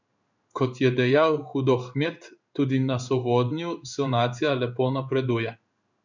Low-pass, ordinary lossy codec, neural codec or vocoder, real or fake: 7.2 kHz; none; codec, 16 kHz in and 24 kHz out, 1 kbps, XY-Tokenizer; fake